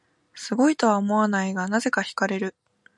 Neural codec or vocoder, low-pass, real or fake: none; 10.8 kHz; real